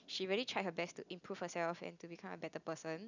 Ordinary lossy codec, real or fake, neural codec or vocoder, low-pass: none; real; none; 7.2 kHz